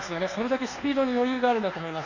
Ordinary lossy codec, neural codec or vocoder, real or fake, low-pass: none; codec, 24 kHz, 1.2 kbps, DualCodec; fake; 7.2 kHz